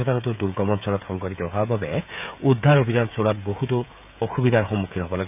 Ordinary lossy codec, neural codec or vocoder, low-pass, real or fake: none; codec, 16 kHz, 16 kbps, FreqCodec, smaller model; 3.6 kHz; fake